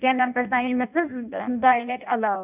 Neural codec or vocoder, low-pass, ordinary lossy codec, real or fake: codec, 16 kHz in and 24 kHz out, 0.6 kbps, FireRedTTS-2 codec; 3.6 kHz; none; fake